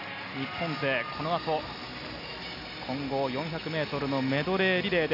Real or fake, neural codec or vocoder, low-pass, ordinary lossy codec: real; none; 5.4 kHz; none